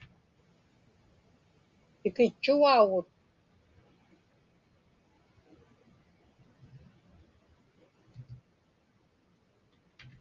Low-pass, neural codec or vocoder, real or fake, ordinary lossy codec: 7.2 kHz; none; real; Opus, 32 kbps